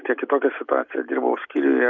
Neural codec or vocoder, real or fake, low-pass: none; real; 7.2 kHz